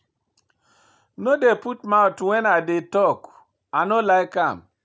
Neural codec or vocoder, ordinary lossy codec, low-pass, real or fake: none; none; none; real